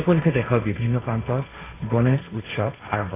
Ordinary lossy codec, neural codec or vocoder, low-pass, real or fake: AAC, 16 kbps; codec, 16 kHz, 1.1 kbps, Voila-Tokenizer; 3.6 kHz; fake